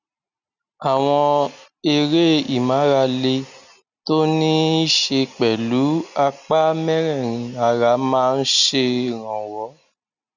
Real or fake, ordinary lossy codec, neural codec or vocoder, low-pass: real; none; none; 7.2 kHz